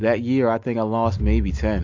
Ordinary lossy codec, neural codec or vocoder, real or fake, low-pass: AAC, 48 kbps; none; real; 7.2 kHz